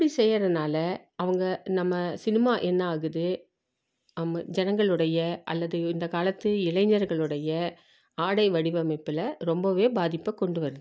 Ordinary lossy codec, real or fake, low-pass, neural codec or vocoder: none; real; none; none